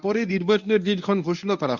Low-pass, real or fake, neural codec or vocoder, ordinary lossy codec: 7.2 kHz; fake; codec, 24 kHz, 0.9 kbps, WavTokenizer, medium speech release version 1; none